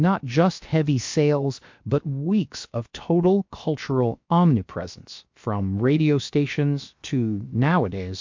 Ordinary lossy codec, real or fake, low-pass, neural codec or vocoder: MP3, 48 kbps; fake; 7.2 kHz; codec, 16 kHz, 0.7 kbps, FocalCodec